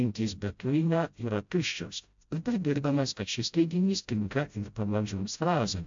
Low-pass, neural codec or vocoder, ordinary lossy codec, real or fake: 7.2 kHz; codec, 16 kHz, 0.5 kbps, FreqCodec, smaller model; AAC, 64 kbps; fake